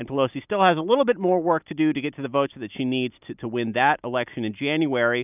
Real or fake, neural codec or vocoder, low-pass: real; none; 3.6 kHz